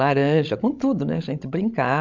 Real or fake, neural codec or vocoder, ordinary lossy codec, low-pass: fake; codec, 16 kHz, 16 kbps, FreqCodec, larger model; MP3, 64 kbps; 7.2 kHz